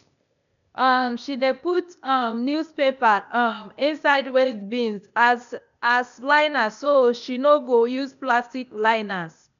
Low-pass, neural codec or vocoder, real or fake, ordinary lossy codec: 7.2 kHz; codec, 16 kHz, 0.8 kbps, ZipCodec; fake; none